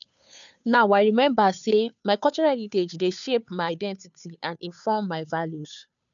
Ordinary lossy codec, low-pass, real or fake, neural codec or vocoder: none; 7.2 kHz; fake; codec, 16 kHz, 2 kbps, FunCodec, trained on Chinese and English, 25 frames a second